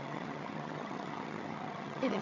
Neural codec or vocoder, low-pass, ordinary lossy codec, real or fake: vocoder, 22.05 kHz, 80 mel bands, HiFi-GAN; 7.2 kHz; none; fake